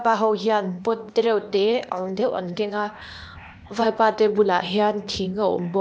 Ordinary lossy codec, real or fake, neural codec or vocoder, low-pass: none; fake; codec, 16 kHz, 0.8 kbps, ZipCodec; none